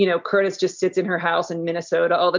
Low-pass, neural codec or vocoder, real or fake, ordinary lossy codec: 7.2 kHz; none; real; MP3, 64 kbps